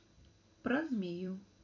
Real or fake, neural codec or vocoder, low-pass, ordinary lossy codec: fake; vocoder, 44.1 kHz, 128 mel bands every 256 samples, BigVGAN v2; 7.2 kHz; MP3, 64 kbps